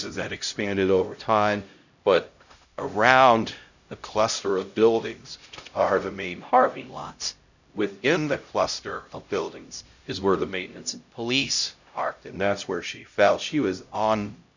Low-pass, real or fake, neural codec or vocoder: 7.2 kHz; fake; codec, 16 kHz, 0.5 kbps, X-Codec, HuBERT features, trained on LibriSpeech